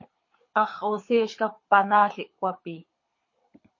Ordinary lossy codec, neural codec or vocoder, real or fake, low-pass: MP3, 32 kbps; codec, 24 kHz, 6 kbps, HILCodec; fake; 7.2 kHz